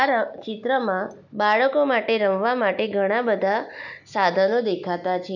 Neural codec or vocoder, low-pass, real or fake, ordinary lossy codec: none; 7.2 kHz; real; none